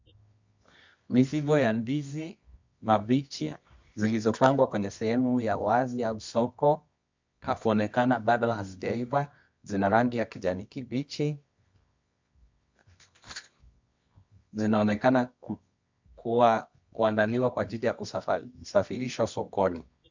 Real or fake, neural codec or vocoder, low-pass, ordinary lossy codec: fake; codec, 24 kHz, 0.9 kbps, WavTokenizer, medium music audio release; 7.2 kHz; MP3, 64 kbps